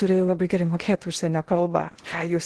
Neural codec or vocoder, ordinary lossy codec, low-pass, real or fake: codec, 16 kHz in and 24 kHz out, 0.8 kbps, FocalCodec, streaming, 65536 codes; Opus, 16 kbps; 10.8 kHz; fake